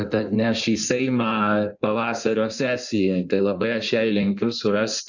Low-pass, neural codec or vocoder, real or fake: 7.2 kHz; codec, 16 kHz in and 24 kHz out, 1.1 kbps, FireRedTTS-2 codec; fake